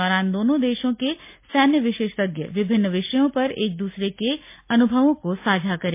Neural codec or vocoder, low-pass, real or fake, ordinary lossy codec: none; 3.6 kHz; real; MP3, 24 kbps